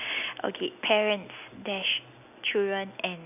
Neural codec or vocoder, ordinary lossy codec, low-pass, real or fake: none; none; 3.6 kHz; real